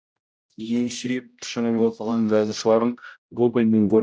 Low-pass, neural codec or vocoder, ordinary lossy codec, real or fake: none; codec, 16 kHz, 0.5 kbps, X-Codec, HuBERT features, trained on general audio; none; fake